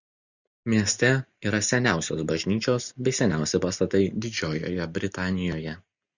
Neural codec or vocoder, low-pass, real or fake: none; 7.2 kHz; real